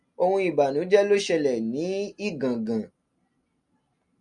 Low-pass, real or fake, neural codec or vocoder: 10.8 kHz; real; none